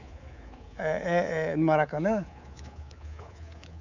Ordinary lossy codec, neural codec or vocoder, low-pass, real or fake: none; codec, 24 kHz, 3.1 kbps, DualCodec; 7.2 kHz; fake